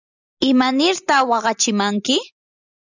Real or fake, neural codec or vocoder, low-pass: real; none; 7.2 kHz